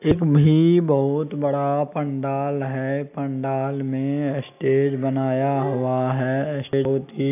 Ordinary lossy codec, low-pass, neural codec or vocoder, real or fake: AAC, 32 kbps; 3.6 kHz; none; real